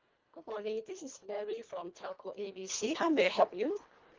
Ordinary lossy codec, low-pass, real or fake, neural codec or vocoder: Opus, 32 kbps; 7.2 kHz; fake; codec, 24 kHz, 1.5 kbps, HILCodec